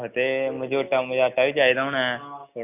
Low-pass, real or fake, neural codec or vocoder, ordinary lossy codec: 3.6 kHz; real; none; none